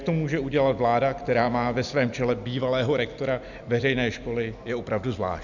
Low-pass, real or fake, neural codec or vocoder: 7.2 kHz; real; none